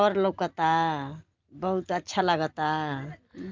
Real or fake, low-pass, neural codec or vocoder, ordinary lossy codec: real; 7.2 kHz; none; Opus, 24 kbps